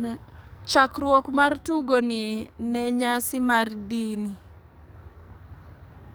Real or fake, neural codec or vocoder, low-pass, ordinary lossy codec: fake; codec, 44.1 kHz, 2.6 kbps, SNAC; none; none